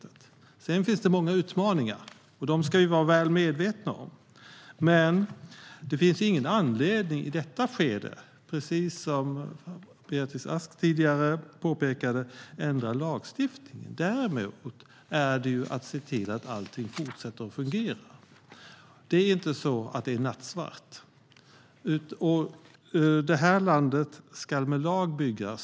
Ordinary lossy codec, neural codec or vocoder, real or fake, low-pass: none; none; real; none